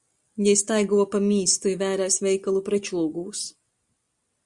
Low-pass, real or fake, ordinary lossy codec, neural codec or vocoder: 10.8 kHz; real; Opus, 64 kbps; none